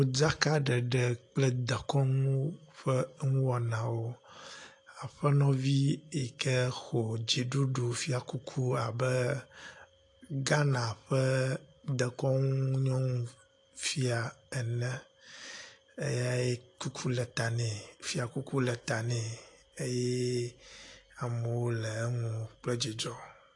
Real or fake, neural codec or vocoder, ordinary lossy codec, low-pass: real; none; AAC, 48 kbps; 10.8 kHz